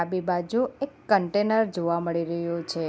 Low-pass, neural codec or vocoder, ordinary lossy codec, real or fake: none; none; none; real